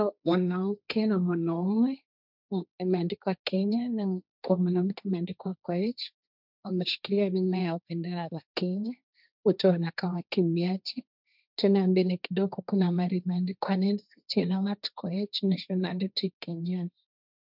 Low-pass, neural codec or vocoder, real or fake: 5.4 kHz; codec, 16 kHz, 1.1 kbps, Voila-Tokenizer; fake